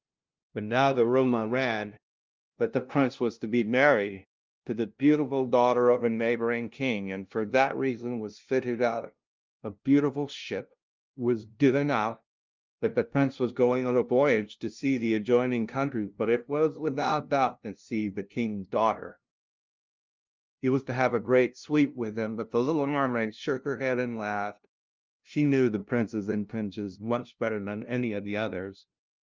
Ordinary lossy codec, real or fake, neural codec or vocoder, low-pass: Opus, 24 kbps; fake; codec, 16 kHz, 0.5 kbps, FunCodec, trained on LibriTTS, 25 frames a second; 7.2 kHz